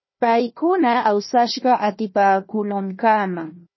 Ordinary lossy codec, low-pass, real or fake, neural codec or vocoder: MP3, 24 kbps; 7.2 kHz; fake; codec, 16 kHz, 1 kbps, FunCodec, trained on Chinese and English, 50 frames a second